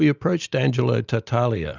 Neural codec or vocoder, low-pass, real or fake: none; 7.2 kHz; real